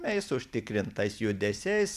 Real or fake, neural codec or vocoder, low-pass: real; none; 14.4 kHz